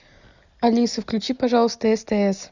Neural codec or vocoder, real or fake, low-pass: none; real; 7.2 kHz